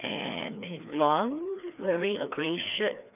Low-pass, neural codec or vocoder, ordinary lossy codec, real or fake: 3.6 kHz; codec, 16 kHz, 2 kbps, FreqCodec, larger model; none; fake